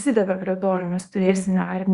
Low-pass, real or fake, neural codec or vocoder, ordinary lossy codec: 10.8 kHz; fake; codec, 24 kHz, 0.9 kbps, WavTokenizer, small release; Opus, 64 kbps